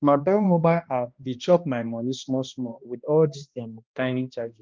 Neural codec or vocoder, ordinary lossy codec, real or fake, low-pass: codec, 16 kHz, 1 kbps, X-Codec, HuBERT features, trained on balanced general audio; Opus, 24 kbps; fake; 7.2 kHz